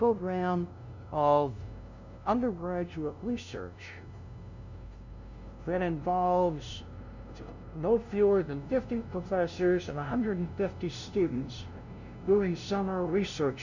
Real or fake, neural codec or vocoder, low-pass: fake; codec, 16 kHz, 0.5 kbps, FunCodec, trained on LibriTTS, 25 frames a second; 7.2 kHz